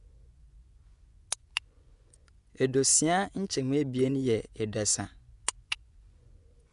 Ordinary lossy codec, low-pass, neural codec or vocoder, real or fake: none; 10.8 kHz; vocoder, 24 kHz, 100 mel bands, Vocos; fake